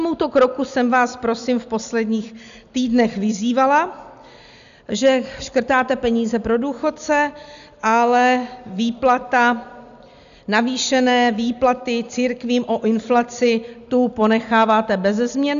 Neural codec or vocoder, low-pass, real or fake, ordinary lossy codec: none; 7.2 kHz; real; AAC, 96 kbps